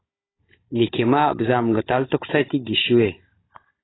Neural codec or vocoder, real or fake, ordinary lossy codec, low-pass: codec, 16 kHz, 16 kbps, FunCodec, trained on Chinese and English, 50 frames a second; fake; AAC, 16 kbps; 7.2 kHz